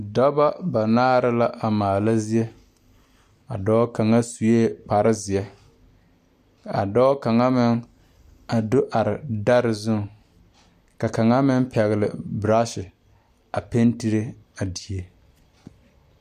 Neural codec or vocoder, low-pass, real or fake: none; 14.4 kHz; real